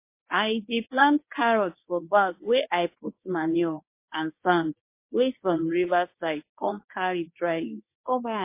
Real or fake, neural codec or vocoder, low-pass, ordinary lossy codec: fake; codec, 24 kHz, 0.9 kbps, WavTokenizer, medium speech release version 1; 3.6 kHz; MP3, 24 kbps